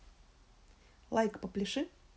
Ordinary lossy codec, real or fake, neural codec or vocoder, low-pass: none; real; none; none